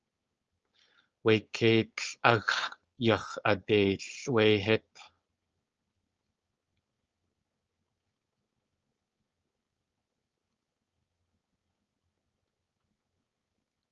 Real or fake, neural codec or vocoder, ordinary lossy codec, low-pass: fake; codec, 16 kHz, 4.8 kbps, FACodec; Opus, 24 kbps; 7.2 kHz